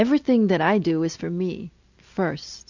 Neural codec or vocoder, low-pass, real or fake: none; 7.2 kHz; real